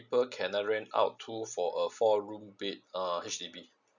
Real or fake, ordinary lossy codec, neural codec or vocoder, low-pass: real; none; none; 7.2 kHz